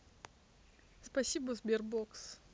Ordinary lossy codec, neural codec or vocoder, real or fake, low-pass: none; none; real; none